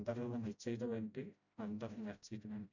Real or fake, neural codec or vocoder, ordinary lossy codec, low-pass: fake; codec, 16 kHz, 0.5 kbps, FreqCodec, smaller model; none; 7.2 kHz